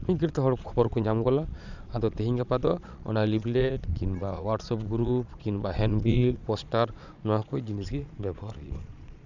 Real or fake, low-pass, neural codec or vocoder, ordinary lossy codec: fake; 7.2 kHz; vocoder, 22.05 kHz, 80 mel bands, Vocos; none